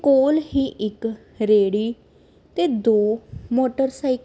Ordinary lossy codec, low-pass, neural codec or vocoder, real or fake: none; none; none; real